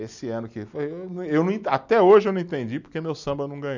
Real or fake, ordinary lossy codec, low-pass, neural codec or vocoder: real; none; 7.2 kHz; none